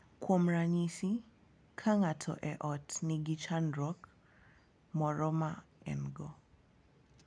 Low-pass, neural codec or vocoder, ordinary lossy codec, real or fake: 9.9 kHz; none; none; real